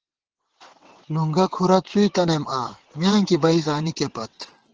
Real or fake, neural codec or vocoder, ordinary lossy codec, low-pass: fake; vocoder, 22.05 kHz, 80 mel bands, WaveNeXt; Opus, 16 kbps; 7.2 kHz